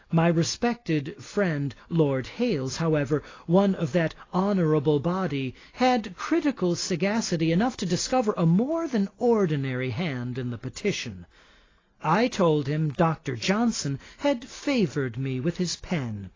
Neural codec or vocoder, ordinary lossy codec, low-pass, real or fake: none; AAC, 32 kbps; 7.2 kHz; real